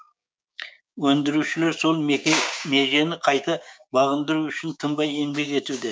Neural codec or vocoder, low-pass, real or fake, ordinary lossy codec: codec, 16 kHz, 6 kbps, DAC; none; fake; none